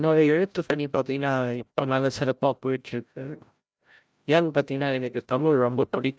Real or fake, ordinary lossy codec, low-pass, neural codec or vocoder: fake; none; none; codec, 16 kHz, 0.5 kbps, FreqCodec, larger model